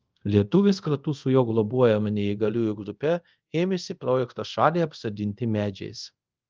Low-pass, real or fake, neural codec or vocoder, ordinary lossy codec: 7.2 kHz; fake; codec, 24 kHz, 0.5 kbps, DualCodec; Opus, 32 kbps